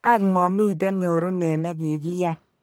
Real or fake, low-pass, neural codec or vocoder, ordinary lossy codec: fake; none; codec, 44.1 kHz, 1.7 kbps, Pupu-Codec; none